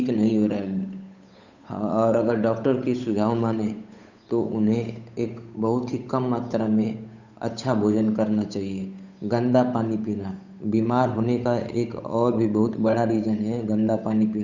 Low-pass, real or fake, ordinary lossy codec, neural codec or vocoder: 7.2 kHz; fake; none; codec, 16 kHz, 8 kbps, FunCodec, trained on Chinese and English, 25 frames a second